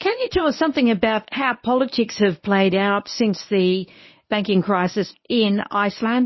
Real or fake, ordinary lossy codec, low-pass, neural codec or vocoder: fake; MP3, 24 kbps; 7.2 kHz; codec, 24 kHz, 0.9 kbps, WavTokenizer, medium speech release version 1